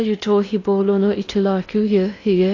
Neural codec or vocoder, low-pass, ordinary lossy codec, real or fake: codec, 16 kHz in and 24 kHz out, 0.6 kbps, FocalCodec, streaming, 4096 codes; 7.2 kHz; AAC, 32 kbps; fake